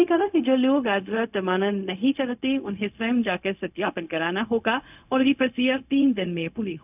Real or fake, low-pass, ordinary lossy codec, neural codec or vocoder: fake; 3.6 kHz; none; codec, 16 kHz, 0.4 kbps, LongCat-Audio-Codec